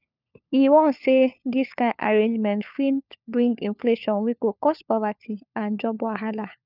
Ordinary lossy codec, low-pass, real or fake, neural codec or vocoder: none; 5.4 kHz; fake; codec, 16 kHz, 4 kbps, FunCodec, trained on LibriTTS, 50 frames a second